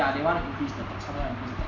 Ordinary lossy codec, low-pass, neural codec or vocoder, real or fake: none; 7.2 kHz; none; real